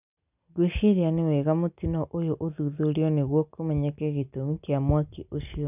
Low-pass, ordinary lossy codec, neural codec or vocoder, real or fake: 3.6 kHz; none; none; real